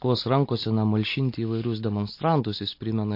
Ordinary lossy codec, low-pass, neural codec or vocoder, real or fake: MP3, 32 kbps; 5.4 kHz; none; real